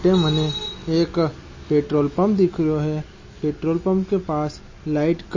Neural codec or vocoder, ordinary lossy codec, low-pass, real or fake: none; MP3, 32 kbps; 7.2 kHz; real